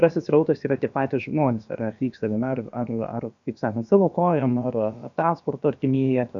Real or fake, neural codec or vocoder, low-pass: fake; codec, 16 kHz, about 1 kbps, DyCAST, with the encoder's durations; 7.2 kHz